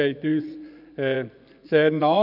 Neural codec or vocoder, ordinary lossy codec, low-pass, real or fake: vocoder, 24 kHz, 100 mel bands, Vocos; none; 5.4 kHz; fake